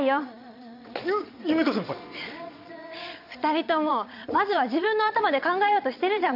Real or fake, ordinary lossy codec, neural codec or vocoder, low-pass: fake; none; vocoder, 22.05 kHz, 80 mel bands, Vocos; 5.4 kHz